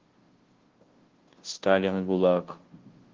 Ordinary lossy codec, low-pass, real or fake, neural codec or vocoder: Opus, 16 kbps; 7.2 kHz; fake; codec, 16 kHz, 0.5 kbps, FunCodec, trained on Chinese and English, 25 frames a second